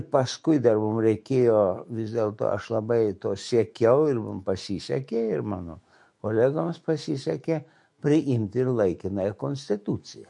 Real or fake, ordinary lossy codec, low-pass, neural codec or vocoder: fake; MP3, 48 kbps; 10.8 kHz; autoencoder, 48 kHz, 128 numbers a frame, DAC-VAE, trained on Japanese speech